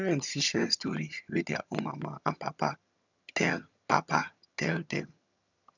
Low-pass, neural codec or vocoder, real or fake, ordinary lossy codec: 7.2 kHz; vocoder, 22.05 kHz, 80 mel bands, HiFi-GAN; fake; none